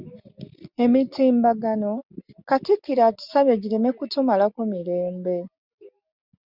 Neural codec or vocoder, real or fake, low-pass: none; real; 5.4 kHz